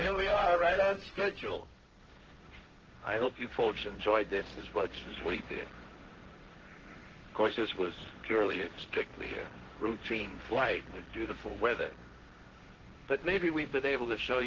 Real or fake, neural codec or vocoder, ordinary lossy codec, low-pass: fake; codec, 16 kHz, 1.1 kbps, Voila-Tokenizer; Opus, 16 kbps; 7.2 kHz